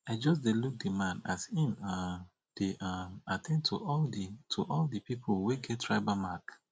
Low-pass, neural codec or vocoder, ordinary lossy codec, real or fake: none; none; none; real